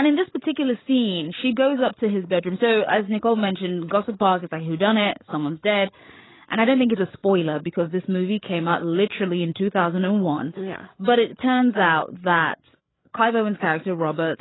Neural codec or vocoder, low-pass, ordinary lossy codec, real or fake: codec, 16 kHz, 8 kbps, FreqCodec, larger model; 7.2 kHz; AAC, 16 kbps; fake